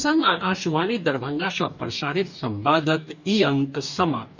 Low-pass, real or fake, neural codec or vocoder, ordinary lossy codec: 7.2 kHz; fake; codec, 44.1 kHz, 2.6 kbps, DAC; none